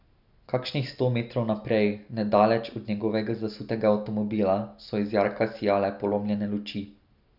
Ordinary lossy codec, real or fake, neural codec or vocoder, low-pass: none; real; none; 5.4 kHz